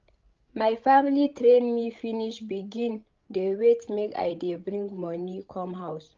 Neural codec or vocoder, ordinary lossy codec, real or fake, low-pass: codec, 16 kHz, 16 kbps, FreqCodec, larger model; Opus, 24 kbps; fake; 7.2 kHz